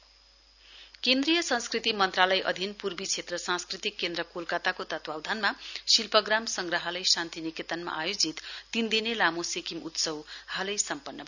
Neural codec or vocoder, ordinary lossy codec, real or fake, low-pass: none; none; real; 7.2 kHz